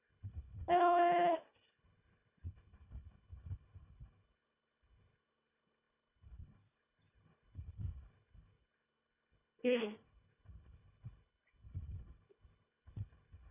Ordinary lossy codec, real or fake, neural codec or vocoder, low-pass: none; fake; codec, 24 kHz, 1.5 kbps, HILCodec; 3.6 kHz